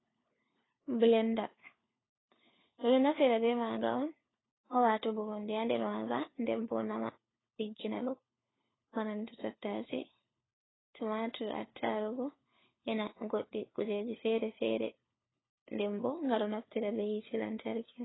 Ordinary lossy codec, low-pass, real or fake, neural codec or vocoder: AAC, 16 kbps; 7.2 kHz; fake; codec, 16 kHz, 4 kbps, FunCodec, trained on LibriTTS, 50 frames a second